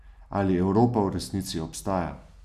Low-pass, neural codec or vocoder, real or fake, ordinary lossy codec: 14.4 kHz; none; real; none